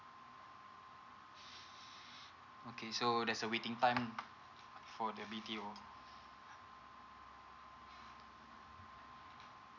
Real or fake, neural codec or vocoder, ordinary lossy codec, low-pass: real; none; none; 7.2 kHz